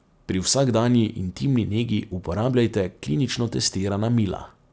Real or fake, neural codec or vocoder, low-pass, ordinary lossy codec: real; none; none; none